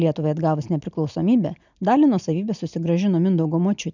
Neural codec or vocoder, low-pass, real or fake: none; 7.2 kHz; real